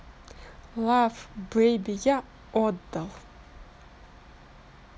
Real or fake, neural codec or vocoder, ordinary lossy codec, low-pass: real; none; none; none